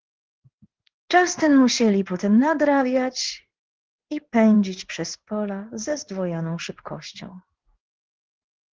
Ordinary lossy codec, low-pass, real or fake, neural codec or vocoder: Opus, 16 kbps; 7.2 kHz; fake; codec, 16 kHz in and 24 kHz out, 1 kbps, XY-Tokenizer